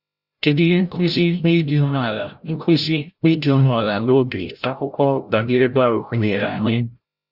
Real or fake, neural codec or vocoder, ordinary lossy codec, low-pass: fake; codec, 16 kHz, 0.5 kbps, FreqCodec, larger model; Opus, 64 kbps; 5.4 kHz